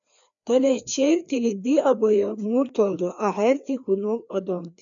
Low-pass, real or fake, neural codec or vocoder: 7.2 kHz; fake; codec, 16 kHz, 2 kbps, FreqCodec, larger model